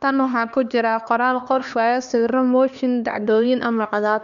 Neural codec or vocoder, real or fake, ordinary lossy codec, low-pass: codec, 16 kHz, 2 kbps, X-Codec, HuBERT features, trained on LibriSpeech; fake; none; 7.2 kHz